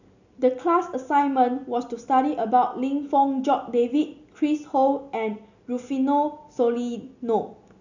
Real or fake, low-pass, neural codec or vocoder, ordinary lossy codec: real; 7.2 kHz; none; none